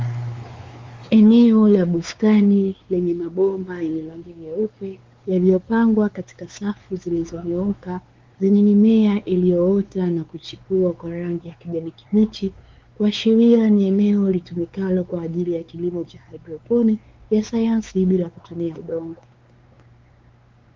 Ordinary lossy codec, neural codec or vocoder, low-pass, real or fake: Opus, 32 kbps; codec, 16 kHz, 4 kbps, X-Codec, WavLM features, trained on Multilingual LibriSpeech; 7.2 kHz; fake